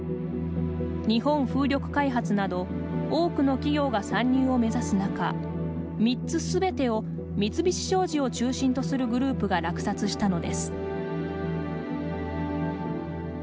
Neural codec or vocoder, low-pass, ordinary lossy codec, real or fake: none; none; none; real